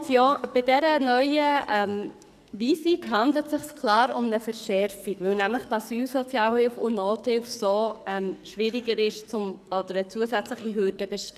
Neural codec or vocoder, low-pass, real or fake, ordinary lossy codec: codec, 44.1 kHz, 2.6 kbps, SNAC; 14.4 kHz; fake; none